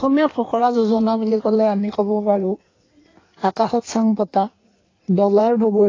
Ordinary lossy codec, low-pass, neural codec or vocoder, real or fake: AAC, 32 kbps; 7.2 kHz; codec, 16 kHz in and 24 kHz out, 1.1 kbps, FireRedTTS-2 codec; fake